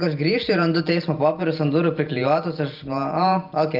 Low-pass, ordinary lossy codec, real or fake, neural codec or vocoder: 5.4 kHz; Opus, 24 kbps; real; none